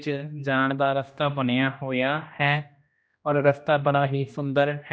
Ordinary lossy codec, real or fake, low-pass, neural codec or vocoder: none; fake; none; codec, 16 kHz, 1 kbps, X-Codec, HuBERT features, trained on general audio